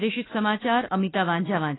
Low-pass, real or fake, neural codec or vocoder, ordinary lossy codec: 7.2 kHz; fake; autoencoder, 48 kHz, 32 numbers a frame, DAC-VAE, trained on Japanese speech; AAC, 16 kbps